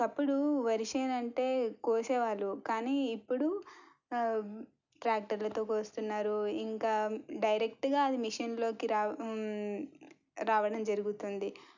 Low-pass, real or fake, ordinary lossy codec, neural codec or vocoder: 7.2 kHz; real; none; none